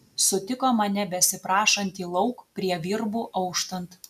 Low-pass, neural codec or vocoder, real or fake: 14.4 kHz; none; real